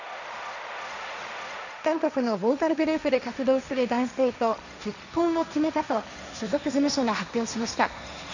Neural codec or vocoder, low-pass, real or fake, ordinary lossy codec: codec, 16 kHz, 1.1 kbps, Voila-Tokenizer; 7.2 kHz; fake; none